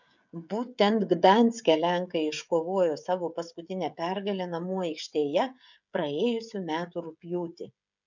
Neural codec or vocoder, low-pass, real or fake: codec, 16 kHz, 16 kbps, FreqCodec, smaller model; 7.2 kHz; fake